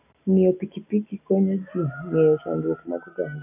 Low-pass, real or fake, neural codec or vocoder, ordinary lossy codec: 3.6 kHz; real; none; none